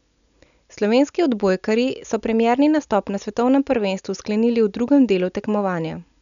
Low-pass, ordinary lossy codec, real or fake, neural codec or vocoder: 7.2 kHz; none; real; none